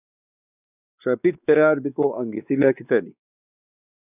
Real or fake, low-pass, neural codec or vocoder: fake; 3.6 kHz; codec, 16 kHz, 2 kbps, X-Codec, WavLM features, trained on Multilingual LibriSpeech